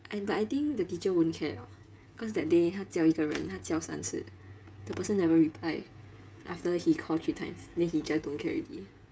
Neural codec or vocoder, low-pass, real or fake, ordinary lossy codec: codec, 16 kHz, 16 kbps, FreqCodec, smaller model; none; fake; none